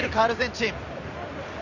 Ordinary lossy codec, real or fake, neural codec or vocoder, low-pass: none; fake; vocoder, 44.1 kHz, 128 mel bands every 256 samples, BigVGAN v2; 7.2 kHz